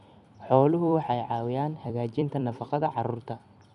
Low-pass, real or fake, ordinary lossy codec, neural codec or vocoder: 10.8 kHz; fake; none; vocoder, 44.1 kHz, 128 mel bands every 256 samples, BigVGAN v2